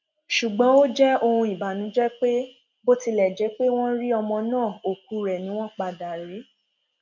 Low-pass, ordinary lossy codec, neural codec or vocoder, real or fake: 7.2 kHz; none; none; real